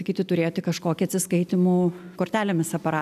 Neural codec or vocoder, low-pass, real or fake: none; 14.4 kHz; real